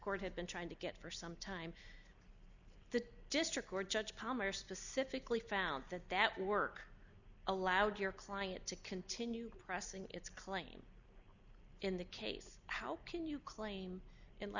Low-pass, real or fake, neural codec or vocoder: 7.2 kHz; real; none